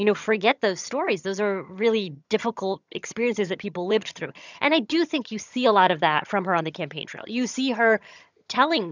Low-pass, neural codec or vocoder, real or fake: 7.2 kHz; vocoder, 22.05 kHz, 80 mel bands, HiFi-GAN; fake